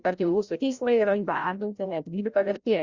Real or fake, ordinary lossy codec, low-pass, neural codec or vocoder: fake; Opus, 64 kbps; 7.2 kHz; codec, 16 kHz, 0.5 kbps, FreqCodec, larger model